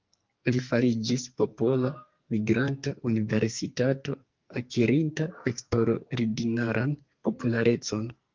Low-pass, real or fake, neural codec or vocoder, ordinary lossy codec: 7.2 kHz; fake; codec, 44.1 kHz, 2.6 kbps, SNAC; Opus, 32 kbps